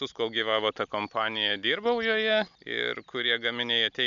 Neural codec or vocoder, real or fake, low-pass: none; real; 7.2 kHz